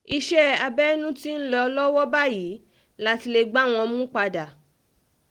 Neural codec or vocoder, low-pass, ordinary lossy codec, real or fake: none; 19.8 kHz; Opus, 24 kbps; real